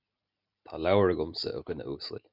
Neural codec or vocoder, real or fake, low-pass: none; real; 5.4 kHz